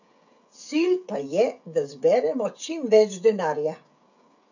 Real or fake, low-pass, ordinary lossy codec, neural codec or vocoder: fake; 7.2 kHz; none; codec, 16 kHz, 16 kbps, FreqCodec, smaller model